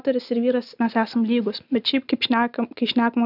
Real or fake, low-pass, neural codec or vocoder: real; 5.4 kHz; none